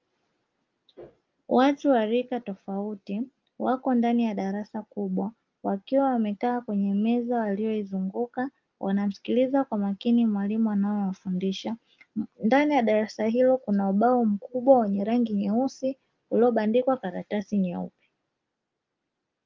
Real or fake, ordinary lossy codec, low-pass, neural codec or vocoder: real; Opus, 24 kbps; 7.2 kHz; none